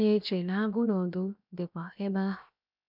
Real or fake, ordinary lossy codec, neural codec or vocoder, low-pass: fake; none; codec, 16 kHz, 0.7 kbps, FocalCodec; 5.4 kHz